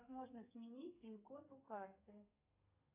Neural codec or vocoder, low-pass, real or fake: codec, 32 kHz, 1.9 kbps, SNAC; 3.6 kHz; fake